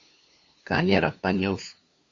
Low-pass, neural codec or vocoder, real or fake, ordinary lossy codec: 7.2 kHz; codec, 16 kHz, 2 kbps, FunCodec, trained on LibriTTS, 25 frames a second; fake; Opus, 64 kbps